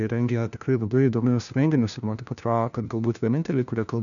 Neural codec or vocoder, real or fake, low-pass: codec, 16 kHz, 1 kbps, FunCodec, trained on LibriTTS, 50 frames a second; fake; 7.2 kHz